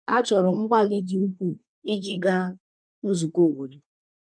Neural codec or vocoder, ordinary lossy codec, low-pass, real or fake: codec, 24 kHz, 1 kbps, SNAC; none; 9.9 kHz; fake